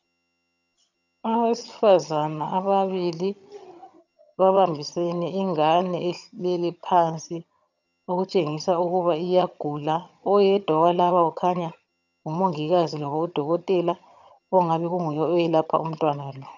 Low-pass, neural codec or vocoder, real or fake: 7.2 kHz; vocoder, 22.05 kHz, 80 mel bands, HiFi-GAN; fake